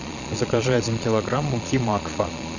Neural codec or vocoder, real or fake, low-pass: vocoder, 22.05 kHz, 80 mel bands, Vocos; fake; 7.2 kHz